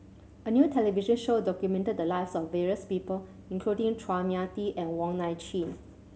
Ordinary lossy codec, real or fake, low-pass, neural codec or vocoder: none; real; none; none